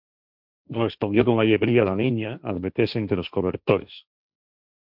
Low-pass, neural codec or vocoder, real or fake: 5.4 kHz; codec, 16 kHz, 1.1 kbps, Voila-Tokenizer; fake